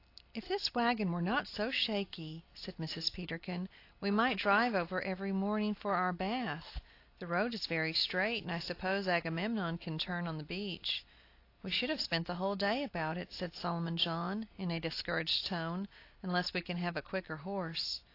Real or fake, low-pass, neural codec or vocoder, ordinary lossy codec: real; 5.4 kHz; none; AAC, 32 kbps